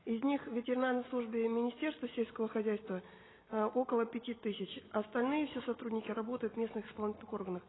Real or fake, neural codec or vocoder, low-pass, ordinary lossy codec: real; none; 7.2 kHz; AAC, 16 kbps